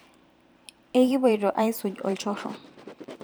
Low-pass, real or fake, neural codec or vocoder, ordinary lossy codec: none; fake; vocoder, 44.1 kHz, 128 mel bands every 512 samples, BigVGAN v2; none